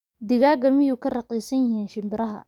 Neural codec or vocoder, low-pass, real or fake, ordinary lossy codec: codec, 44.1 kHz, 7.8 kbps, DAC; 19.8 kHz; fake; none